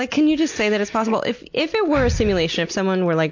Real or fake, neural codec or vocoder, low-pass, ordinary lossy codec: real; none; 7.2 kHz; MP3, 48 kbps